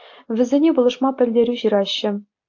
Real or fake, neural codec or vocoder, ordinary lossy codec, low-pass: real; none; AAC, 48 kbps; 7.2 kHz